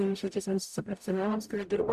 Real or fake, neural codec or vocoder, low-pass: fake; codec, 44.1 kHz, 0.9 kbps, DAC; 14.4 kHz